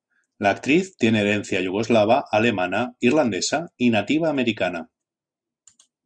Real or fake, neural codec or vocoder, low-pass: real; none; 9.9 kHz